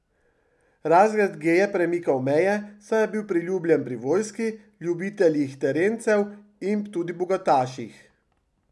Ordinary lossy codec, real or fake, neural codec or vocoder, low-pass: none; real; none; none